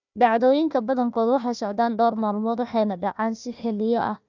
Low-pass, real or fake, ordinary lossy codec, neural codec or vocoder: 7.2 kHz; fake; none; codec, 16 kHz, 1 kbps, FunCodec, trained on Chinese and English, 50 frames a second